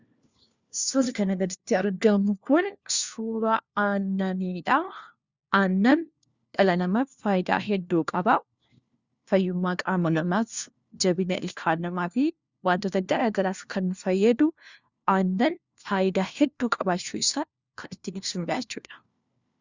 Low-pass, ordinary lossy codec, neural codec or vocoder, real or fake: 7.2 kHz; Opus, 64 kbps; codec, 16 kHz, 1 kbps, FunCodec, trained on LibriTTS, 50 frames a second; fake